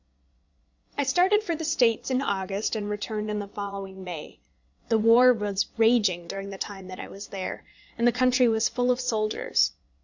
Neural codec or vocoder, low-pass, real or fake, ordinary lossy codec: vocoder, 22.05 kHz, 80 mel bands, Vocos; 7.2 kHz; fake; Opus, 64 kbps